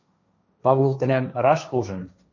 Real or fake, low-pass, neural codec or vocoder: fake; 7.2 kHz; codec, 16 kHz, 1.1 kbps, Voila-Tokenizer